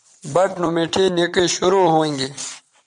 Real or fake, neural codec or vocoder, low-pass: fake; vocoder, 22.05 kHz, 80 mel bands, WaveNeXt; 9.9 kHz